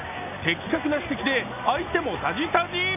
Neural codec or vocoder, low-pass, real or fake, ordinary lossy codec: none; 3.6 kHz; real; none